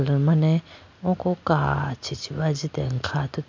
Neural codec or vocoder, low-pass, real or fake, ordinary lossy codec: none; 7.2 kHz; real; MP3, 64 kbps